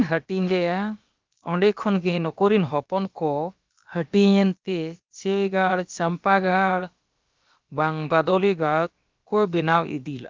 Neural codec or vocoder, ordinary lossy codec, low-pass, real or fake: codec, 16 kHz, about 1 kbps, DyCAST, with the encoder's durations; Opus, 32 kbps; 7.2 kHz; fake